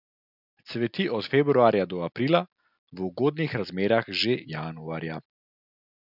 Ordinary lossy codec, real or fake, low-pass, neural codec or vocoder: none; real; 5.4 kHz; none